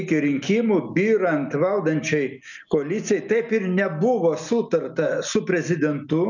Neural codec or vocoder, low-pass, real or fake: none; 7.2 kHz; real